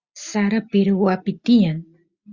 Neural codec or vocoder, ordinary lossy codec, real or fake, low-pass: none; Opus, 64 kbps; real; 7.2 kHz